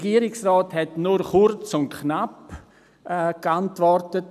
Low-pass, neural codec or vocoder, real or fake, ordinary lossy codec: 14.4 kHz; none; real; none